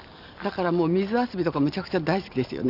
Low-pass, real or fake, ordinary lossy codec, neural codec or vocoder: 5.4 kHz; real; none; none